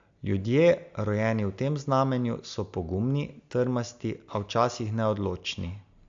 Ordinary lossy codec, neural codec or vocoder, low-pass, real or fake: AAC, 64 kbps; none; 7.2 kHz; real